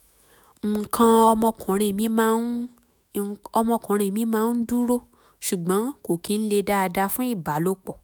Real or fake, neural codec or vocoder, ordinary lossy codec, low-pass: fake; autoencoder, 48 kHz, 128 numbers a frame, DAC-VAE, trained on Japanese speech; none; none